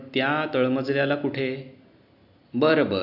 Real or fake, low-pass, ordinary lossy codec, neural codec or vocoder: real; 5.4 kHz; none; none